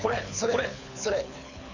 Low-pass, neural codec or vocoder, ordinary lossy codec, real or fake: 7.2 kHz; codec, 24 kHz, 6 kbps, HILCodec; none; fake